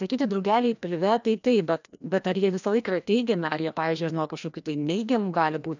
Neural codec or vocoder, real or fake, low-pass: codec, 16 kHz, 1 kbps, FreqCodec, larger model; fake; 7.2 kHz